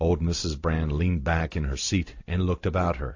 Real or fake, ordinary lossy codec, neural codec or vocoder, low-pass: real; AAC, 48 kbps; none; 7.2 kHz